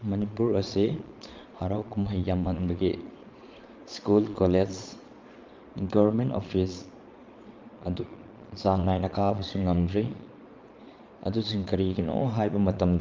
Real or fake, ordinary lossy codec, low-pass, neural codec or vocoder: fake; Opus, 32 kbps; 7.2 kHz; vocoder, 22.05 kHz, 80 mel bands, Vocos